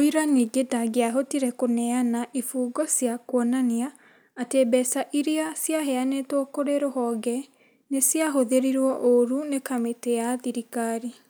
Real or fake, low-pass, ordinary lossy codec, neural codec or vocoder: real; none; none; none